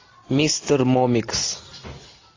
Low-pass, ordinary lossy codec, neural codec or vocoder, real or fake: 7.2 kHz; AAC, 32 kbps; none; real